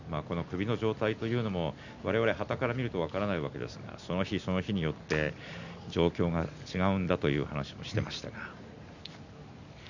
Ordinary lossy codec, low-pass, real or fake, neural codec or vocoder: none; 7.2 kHz; real; none